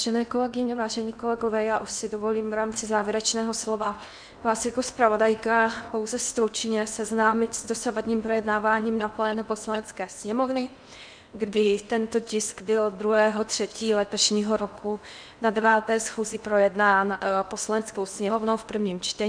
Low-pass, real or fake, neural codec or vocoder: 9.9 kHz; fake; codec, 16 kHz in and 24 kHz out, 0.8 kbps, FocalCodec, streaming, 65536 codes